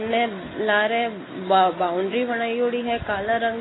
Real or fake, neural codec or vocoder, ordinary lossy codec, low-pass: real; none; AAC, 16 kbps; 7.2 kHz